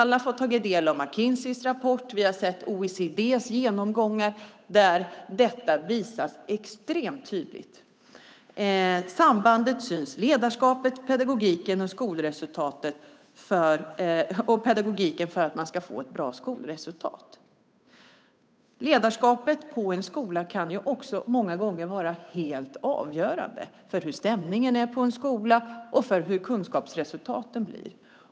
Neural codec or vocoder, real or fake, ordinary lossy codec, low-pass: codec, 16 kHz, 8 kbps, FunCodec, trained on Chinese and English, 25 frames a second; fake; none; none